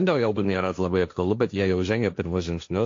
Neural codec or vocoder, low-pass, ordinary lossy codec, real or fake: codec, 16 kHz, 1.1 kbps, Voila-Tokenizer; 7.2 kHz; AAC, 48 kbps; fake